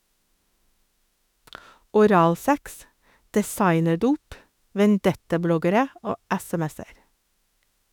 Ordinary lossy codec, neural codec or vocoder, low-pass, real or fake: none; autoencoder, 48 kHz, 32 numbers a frame, DAC-VAE, trained on Japanese speech; 19.8 kHz; fake